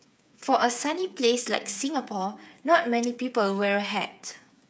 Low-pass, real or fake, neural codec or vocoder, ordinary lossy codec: none; fake; codec, 16 kHz, 8 kbps, FreqCodec, smaller model; none